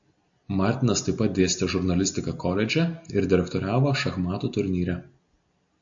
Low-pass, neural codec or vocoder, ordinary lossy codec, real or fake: 7.2 kHz; none; AAC, 64 kbps; real